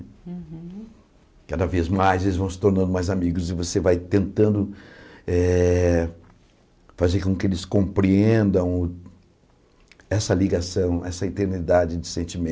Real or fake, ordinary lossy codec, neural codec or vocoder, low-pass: real; none; none; none